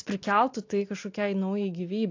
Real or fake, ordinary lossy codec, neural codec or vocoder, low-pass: real; AAC, 48 kbps; none; 7.2 kHz